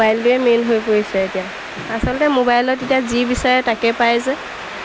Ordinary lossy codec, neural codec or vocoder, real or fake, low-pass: none; none; real; none